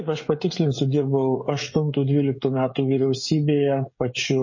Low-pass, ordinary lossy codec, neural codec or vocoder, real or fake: 7.2 kHz; MP3, 32 kbps; codec, 16 kHz, 16 kbps, FreqCodec, smaller model; fake